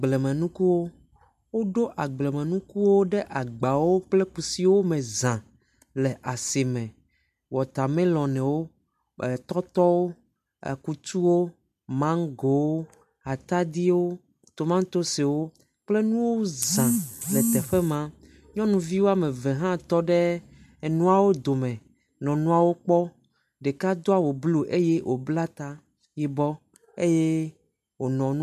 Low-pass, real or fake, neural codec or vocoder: 14.4 kHz; real; none